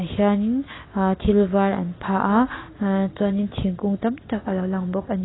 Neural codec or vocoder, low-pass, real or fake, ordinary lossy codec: none; 7.2 kHz; real; AAC, 16 kbps